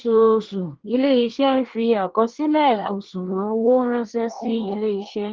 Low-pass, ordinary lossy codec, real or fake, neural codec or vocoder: 7.2 kHz; Opus, 16 kbps; fake; codec, 24 kHz, 1 kbps, SNAC